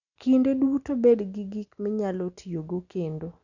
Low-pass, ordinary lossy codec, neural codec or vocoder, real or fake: 7.2 kHz; none; none; real